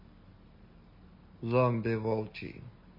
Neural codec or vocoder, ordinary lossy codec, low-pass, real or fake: none; MP3, 24 kbps; 5.4 kHz; real